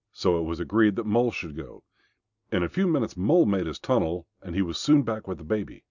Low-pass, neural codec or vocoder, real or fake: 7.2 kHz; none; real